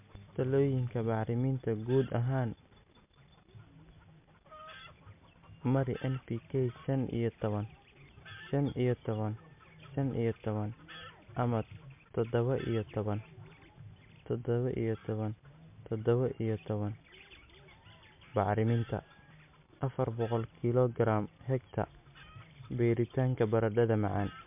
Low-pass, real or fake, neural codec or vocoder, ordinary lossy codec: 3.6 kHz; real; none; MP3, 32 kbps